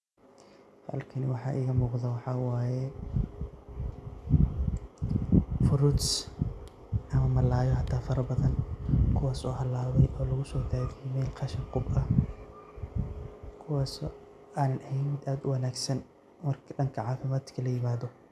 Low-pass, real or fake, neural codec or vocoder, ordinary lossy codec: none; real; none; none